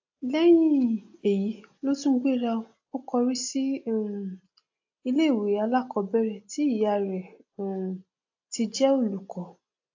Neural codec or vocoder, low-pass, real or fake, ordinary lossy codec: none; 7.2 kHz; real; AAC, 48 kbps